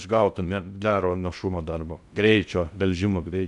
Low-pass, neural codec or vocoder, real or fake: 10.8 kHz; codec, 16 kHz in and 24 kHz out, 0.6 kbps, FocalCodec, streaming, 2048 codes; fake